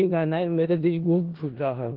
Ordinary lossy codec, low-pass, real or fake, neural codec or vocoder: Opus, 16 kbps; 5.4 kHz; fake; codec, 16 kHz in and 24 kHz out, 0.4 kbps, LongCat-Audio-Codec, four codebook decoder